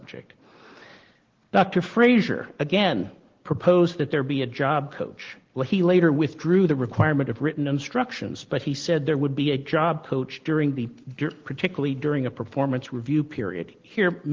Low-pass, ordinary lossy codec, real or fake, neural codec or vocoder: 7.2 kHz; Opus, 16 kbps; real; none